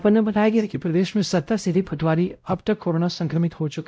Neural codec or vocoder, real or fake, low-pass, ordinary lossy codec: codec, 16 kHz, 0.5 kbps, X-Codec, WavLM features, trained on Multilingual LibriSpeech; fake; none; none